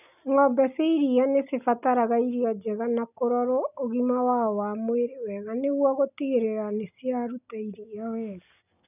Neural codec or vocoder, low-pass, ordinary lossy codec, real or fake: none; 3.6 kHz; none; real